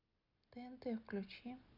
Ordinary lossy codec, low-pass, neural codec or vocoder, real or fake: none; 5.4 kHz; none; real